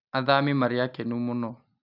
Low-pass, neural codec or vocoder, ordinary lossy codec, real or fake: 5.4 kHz; none; none; real